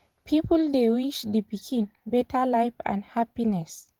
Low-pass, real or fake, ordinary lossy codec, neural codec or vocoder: 19.8 kHz; fake; Opus, 24 kbps; vocoder, 48 kHz, 128 mel bands, Vocos